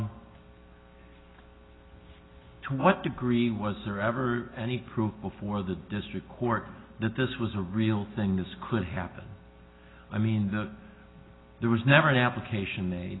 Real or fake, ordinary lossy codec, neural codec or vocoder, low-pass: real; AAC, 16 kbps; none; 7.2 kHz